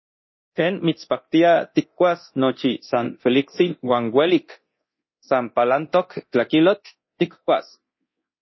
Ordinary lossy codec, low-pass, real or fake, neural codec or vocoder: MP3, 24 kbps; 7.2 kHz; fake; codec, 24 kHz, 0.9 kbps, DualCodec